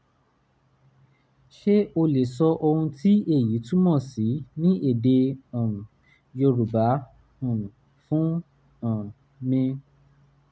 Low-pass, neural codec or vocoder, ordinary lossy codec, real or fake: none; none; none; real